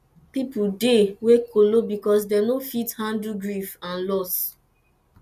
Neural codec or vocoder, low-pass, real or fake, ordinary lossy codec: none; 14.4 kHz; real; none